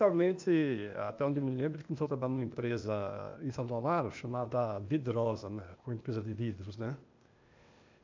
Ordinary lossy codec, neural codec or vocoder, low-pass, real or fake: MP3, 64 kbps; codec, 16 kHz, 0.8 kbps, ZipCodec; 7.2 kHz; fake